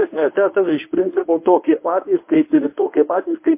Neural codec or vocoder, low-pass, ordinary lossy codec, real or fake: codec, 24 kHz, 0.9 kbps, WavTokenizer, medium speech release version 1; 3.6 kHz; MP3, 24 kbps; fake